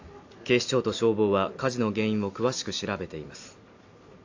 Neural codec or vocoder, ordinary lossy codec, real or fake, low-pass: none; AAC, 48 kbps; real; 7.2 kHz